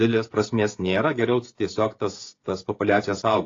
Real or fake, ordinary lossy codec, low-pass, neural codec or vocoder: fake; AAC, 32 kbps; 7.2 kHz; codec, 16 kHz, 16 kbps, FreqCodec, smaller model